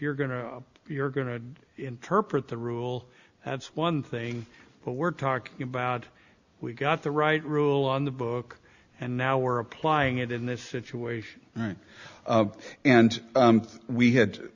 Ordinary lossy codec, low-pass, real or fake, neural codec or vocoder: Opus, 64 kbps; 7.2 kHz; real; none